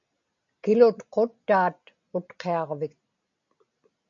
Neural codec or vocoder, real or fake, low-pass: none; real; 7.2 kHz